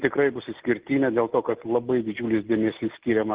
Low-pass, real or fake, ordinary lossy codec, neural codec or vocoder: 3.6 kHz; real; Opus, 16 kbps; none